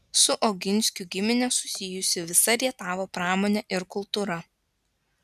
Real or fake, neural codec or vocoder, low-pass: real; none; 14.4 kHz